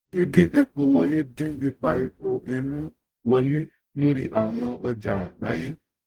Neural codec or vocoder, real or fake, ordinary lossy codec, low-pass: codec, 44.1 kHz, 0.9 kbps, DAC; fake; Opus, 24 kbps; 19.8 kHz